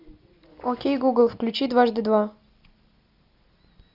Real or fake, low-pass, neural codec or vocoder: real; 5.4 kHz; none